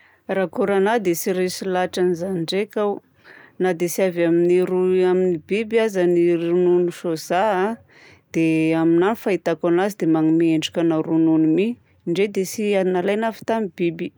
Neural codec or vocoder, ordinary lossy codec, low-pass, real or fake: none; none; none; real